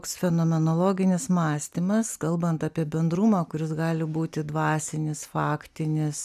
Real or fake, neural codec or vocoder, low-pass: real; none; 14.4 kHz